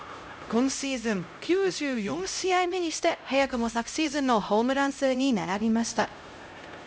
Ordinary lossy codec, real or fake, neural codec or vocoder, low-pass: none; fake; codec, 16 kHz, 0.5 kbps, X-Codec, HuBERT features, trained on LibriSpeech; none